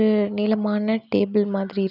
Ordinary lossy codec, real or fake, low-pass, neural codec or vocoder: none; real; 5.4 kHz; none